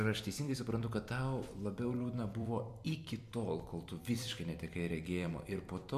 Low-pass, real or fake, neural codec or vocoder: 14.4 kHz; fake; vocoder, 44.1 kHz, 128 mel bands every 256 samples, BigVGAN v2